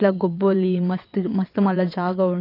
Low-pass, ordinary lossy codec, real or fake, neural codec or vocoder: 5.4 kHz; AAC, 32 kbps; fake; vocoder, 22.05 kHz, 80 mel bands, WaveNeXt